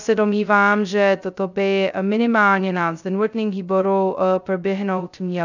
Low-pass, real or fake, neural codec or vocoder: 7.2 kHz; fake; codec, 16 kHz, 0.2 kbps, FocalCodec